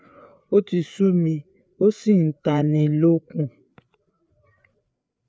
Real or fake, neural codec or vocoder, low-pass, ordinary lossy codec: fake; codec, 16 kHz, 4 kbps, FreqCodec, larger model; none; none